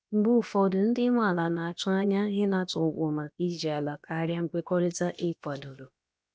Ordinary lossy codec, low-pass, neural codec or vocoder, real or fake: none; none; codec, 16 kHz, about 1 kbps, DyCAST, with the encoder's durations; fake